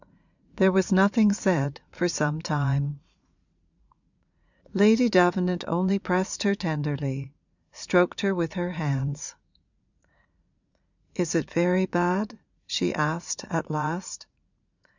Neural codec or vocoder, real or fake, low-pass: vocoder, 44.1 kHz, 80 mel bands, Vocos; fake; 7.2 kHz